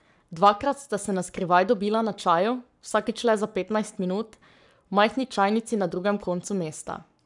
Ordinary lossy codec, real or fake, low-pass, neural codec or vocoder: none; fake; 10.8 kHz; codec, 44.1 kHz, 7.8 kbps, Pupu-Codec